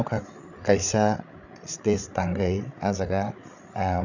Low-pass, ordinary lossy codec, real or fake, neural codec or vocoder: 7.2 kHz; none; fake; codec, 16 kHz, 8 kbps, FreqCodec, larger model